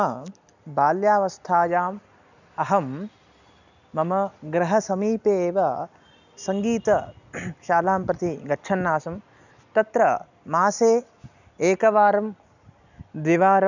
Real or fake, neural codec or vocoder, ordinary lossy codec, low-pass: real; none; none; 7.2 kHz